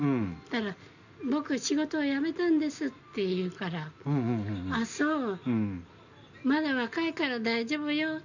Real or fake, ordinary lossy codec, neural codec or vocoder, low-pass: real; none; none; 7.2 kHz